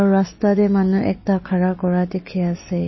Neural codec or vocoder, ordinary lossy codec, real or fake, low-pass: none; MP3, 24 kbps; real; 7.2 kHz